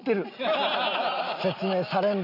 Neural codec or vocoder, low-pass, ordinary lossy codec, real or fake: none; 5.4 kHz; none; real